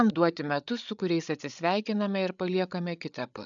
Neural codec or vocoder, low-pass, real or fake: codec, 16 kHz, 8 kbps, FreqCodec, larger model; 7.2 kHz; fake